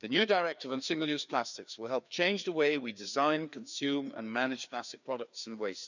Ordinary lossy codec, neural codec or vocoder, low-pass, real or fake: none; codec, 16 kHz, 2 kbps, FreqCodec, larger model; 7.2 kHz; fake